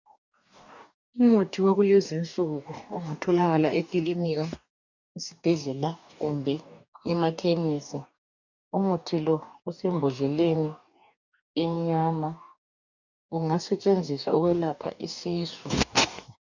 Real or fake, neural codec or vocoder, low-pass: fake; codec, 44.1 kHz, 2.6 kbps, DAC; 7.2 kHz